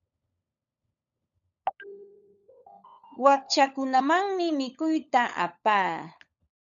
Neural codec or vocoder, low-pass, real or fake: codec, 16 kHz, 16 kbps, FunCodec, trained on LibriTTS, 50 frames a second; 7.2 kHz; fake